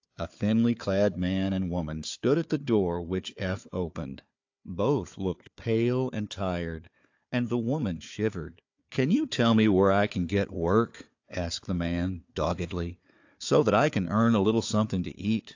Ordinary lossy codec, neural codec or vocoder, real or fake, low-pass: AAC, 48 kbps; codec, 16 kHz, 4 kbps, FunCodec, trained on Chinese and English, 50 frames a second; fake; 7.2 kHz